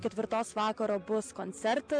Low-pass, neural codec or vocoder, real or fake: 9.9 kHz; none; real